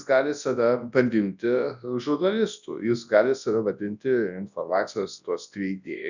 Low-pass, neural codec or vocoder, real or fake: 7.2 kHz; codec, 24 kHz, 0.9 kbps, WavTokenizer, large speech release; fake